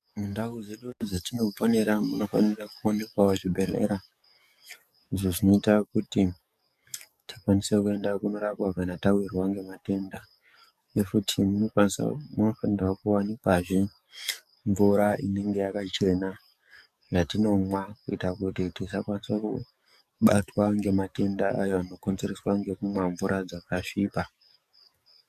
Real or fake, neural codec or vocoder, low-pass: fake; codec, 44.1 kHz, 7.8 kbps, DAC; 14.4 kHz